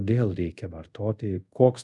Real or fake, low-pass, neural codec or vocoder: fake; 10.8 kHz; codec, 24 kHz, 0.5 kbps, DualCodec